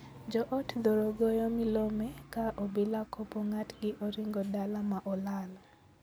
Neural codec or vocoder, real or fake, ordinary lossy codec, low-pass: none; real; none; none